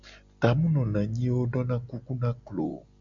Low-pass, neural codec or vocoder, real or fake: 7.2 kHz; none; real